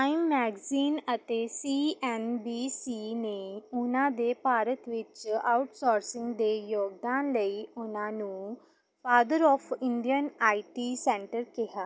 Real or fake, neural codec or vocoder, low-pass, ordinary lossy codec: real; none; none; none